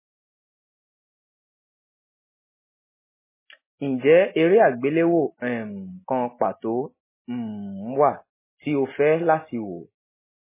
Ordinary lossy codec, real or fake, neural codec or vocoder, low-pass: MP3, 16 kbps; real; none; 3.6 kHz